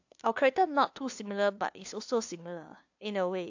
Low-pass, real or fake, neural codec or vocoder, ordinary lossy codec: 7.2 kHz; fake; codec, 16 kHz, 2 kbps, FunCodec, trained on LibriTTS, 25 frames a second; AAC, 48 kbps